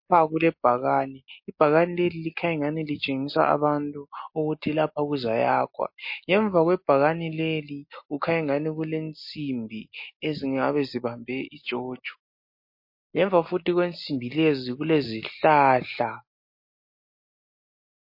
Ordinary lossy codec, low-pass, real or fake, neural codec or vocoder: MP3, 32 kbps; 5.4 kHz; real; none